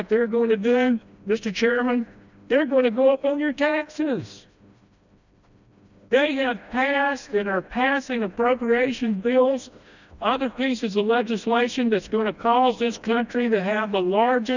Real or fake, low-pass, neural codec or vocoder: fake; 7.2 kHz; codec, 16 kHz, 1 kbps, FreqCodec, smaller model